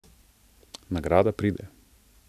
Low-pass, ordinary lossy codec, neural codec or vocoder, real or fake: 14.4 kHz; none; none; real